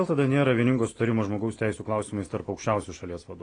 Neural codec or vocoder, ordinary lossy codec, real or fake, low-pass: none; AAC, 32 kbps; real; 9.9 kHz